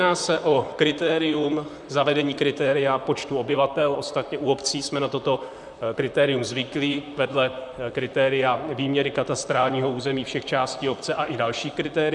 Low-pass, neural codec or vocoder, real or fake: 10.8 kHz; vocoder, 44.1 kHz, 128 mel bands, Pupu-Vocoder; fake